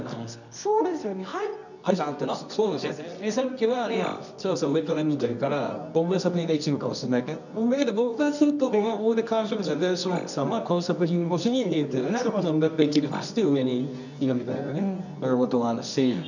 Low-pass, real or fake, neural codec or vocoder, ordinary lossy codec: 7.2 kHz; fake; codec, 24 kHz, 0.9 kbps, WavTokenizer, medium music audio release; none